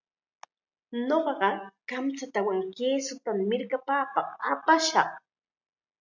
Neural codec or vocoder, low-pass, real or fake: none; 7.2 kHz; real